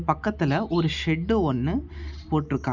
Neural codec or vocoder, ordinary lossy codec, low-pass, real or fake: none; none; 7.2 kHz; real